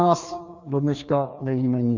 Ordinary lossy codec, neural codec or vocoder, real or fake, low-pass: Opus, 64 kbps; codec, 16 kHz, 2 kbps, FreqCodec, larger model; fake; 7.2 kHz